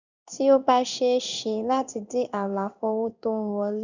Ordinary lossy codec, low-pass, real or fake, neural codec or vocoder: none; 7.2 kHz; fake; codec, 16 kHz in and 24 kHz out, 1 kbps, XY-Tokenizer